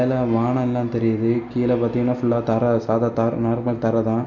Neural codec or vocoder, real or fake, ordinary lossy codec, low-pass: none; real; none; 7.2 kHz